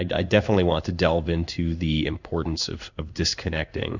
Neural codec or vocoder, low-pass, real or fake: codec, 16 kHz in and 24 kHz out, 1 kbps, XY-Tokenizer; 7.2 kHz; fake